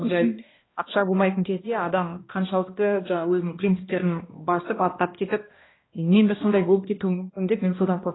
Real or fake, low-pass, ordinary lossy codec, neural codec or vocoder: fake; 7.2 kHz; AAC, 16 kbps; codec, 16 kHz, 1 kbps, X-Codec, HuBERT features, trained on balanced general audio